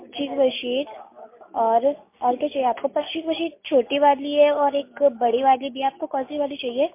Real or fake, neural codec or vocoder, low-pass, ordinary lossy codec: real; none; 3.6 kHz; MP3, 24 kbps